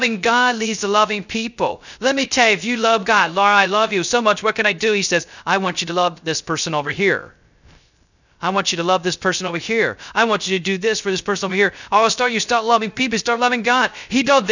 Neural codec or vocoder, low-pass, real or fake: codec, 16 kHz, 0.3 kbps, FocalCodec; 7.2 kHz; fake